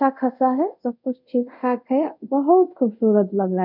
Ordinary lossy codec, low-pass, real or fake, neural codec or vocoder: none; 5.4 kHz; fake; codec, 24 kHz, 0.5 kbps, DualCodec